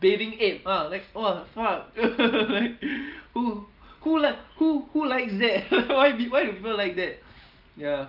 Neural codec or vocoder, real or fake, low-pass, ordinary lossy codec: none; real; 5.4 kHz; Opus, 24 kbps